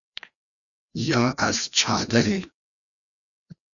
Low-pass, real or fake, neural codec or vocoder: 7.2 kHz; fake; codec, 16 kHz, 1 kbps, FreqCodec, larger model